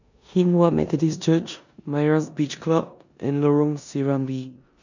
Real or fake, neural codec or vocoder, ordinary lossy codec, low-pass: fake; codec, 16 kHz in and 24 kHz out, 0.9 kbps, LongCat-Audio-Codec, four codebook decoder; none; 7.2 kHz